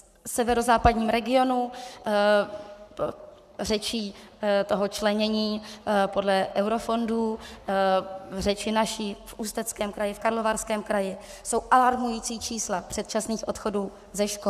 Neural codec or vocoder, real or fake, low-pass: codec, 44.1 kHz, 7.8 kbps, Pupu-Codec; fake; 14.4 kHz